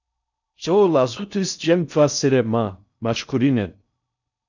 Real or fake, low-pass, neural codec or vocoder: fake; 7.2 kHz; codec, 16 kHz in and 24 kHz out, 0.6 kbps, FocalCodec, streaming, 4096 codes